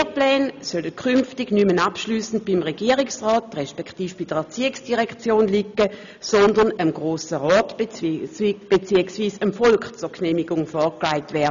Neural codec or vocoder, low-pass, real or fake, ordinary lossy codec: none; 7.2 kHz; real; none